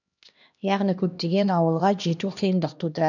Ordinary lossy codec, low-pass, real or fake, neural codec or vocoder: none; 7.2 kHz; fake; codec, 16 kHz, 1 kbps, X-Codec, HuBERT features, trained on LibriSpeech